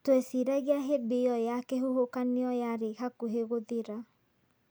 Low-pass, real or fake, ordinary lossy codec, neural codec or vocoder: none; real; none; none